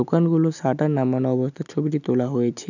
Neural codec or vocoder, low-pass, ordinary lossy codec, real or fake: none; 7.2 kHz; none; real